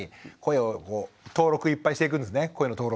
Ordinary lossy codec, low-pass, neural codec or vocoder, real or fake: none; none; none; real